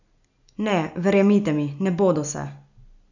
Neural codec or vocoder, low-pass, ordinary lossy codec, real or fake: none; 7.2 kHz; none; real